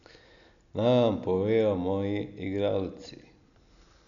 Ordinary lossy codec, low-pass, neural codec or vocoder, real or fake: none; 7.2 kHz; none; real